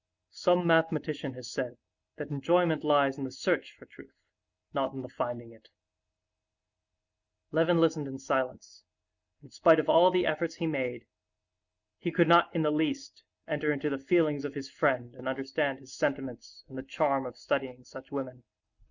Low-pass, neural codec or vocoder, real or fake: 7.2 kHz; none; real